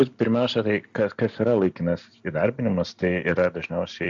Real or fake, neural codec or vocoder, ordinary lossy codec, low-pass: real; none; AAC, 64 kbps; 7.2 kHz